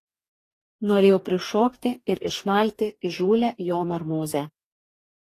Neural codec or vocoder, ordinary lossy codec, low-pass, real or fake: codec, 44.1 kHz, 2.6 kbps, DAC; AAC, 48 kbps; 14.4 kHz; fake